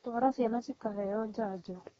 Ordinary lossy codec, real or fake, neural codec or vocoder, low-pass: AAC, 24 kbps; fake; codec, 32 kHz, 1.9 kbps, SNAC; 14.4 kHz